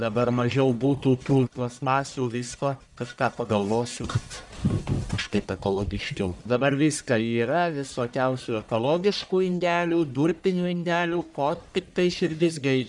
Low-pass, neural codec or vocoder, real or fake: 10.8 kHz; codec, 44.1 kHz, 1.7 kbps, Pupu-Codec; fake